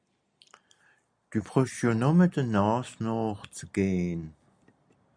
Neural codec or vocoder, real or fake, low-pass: none; real; 9.9 kHz